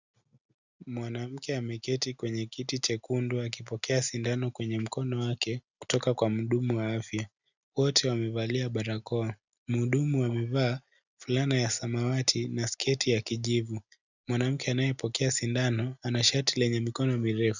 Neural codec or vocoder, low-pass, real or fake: none; 7.2 kHz; real